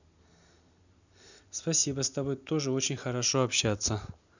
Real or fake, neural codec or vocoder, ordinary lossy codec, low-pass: real; none; none; 7.2 kHz